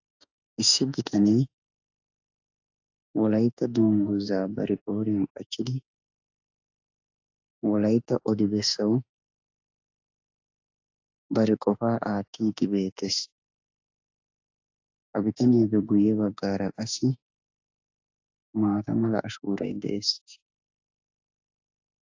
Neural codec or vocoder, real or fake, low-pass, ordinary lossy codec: autoencoder, 48 kHz, 32 numbers a frame, DAC-VAE, trained on Japanese speech; fake; 7.2 kHz; AAC, 48 kbps